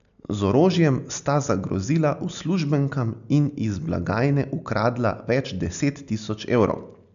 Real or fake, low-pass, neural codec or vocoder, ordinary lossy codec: real; 7.2 kHz; none; none